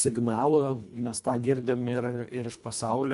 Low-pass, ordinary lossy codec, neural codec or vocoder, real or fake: 10.8 kHz; MP3, 64 kbps; codec, 24 kHz, 1.5 kbps, HILCodec; fake